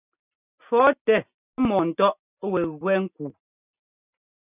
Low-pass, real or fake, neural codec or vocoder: 3.6 kHz; real; none